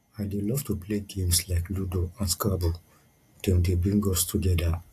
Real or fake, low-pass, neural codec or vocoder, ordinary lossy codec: real; 14.4 kHz; none; AAC, 64 kbps